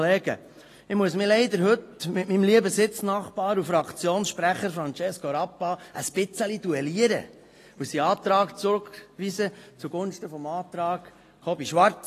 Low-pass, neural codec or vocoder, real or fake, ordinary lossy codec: 14.4 kHz; none; real; AAC, 48 kbps